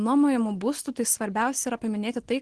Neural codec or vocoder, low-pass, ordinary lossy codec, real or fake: none; 10.8 kHz; Opus, 16 kbps; real